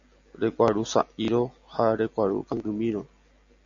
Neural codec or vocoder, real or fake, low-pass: none; real; 7.2 kHz